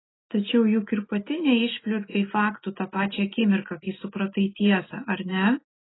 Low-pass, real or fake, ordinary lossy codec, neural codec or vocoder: 7.2 kHz; real; AAC, 16 kbps; none